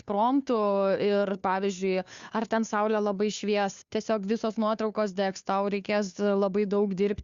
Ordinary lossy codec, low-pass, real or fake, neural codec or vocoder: Opus, 64 kbps; 7.2 kHz; fake; codec, 16 kHz, 2 kbps, FunCodec, trained on Chinese and English, 25 frames a second